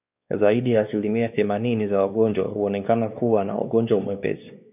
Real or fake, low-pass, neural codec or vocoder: fake; 3.6 kHz; codec, 16 kHz, 2 kbps, X-Codec, WavLM features, trained on Multilingual LibriSpeech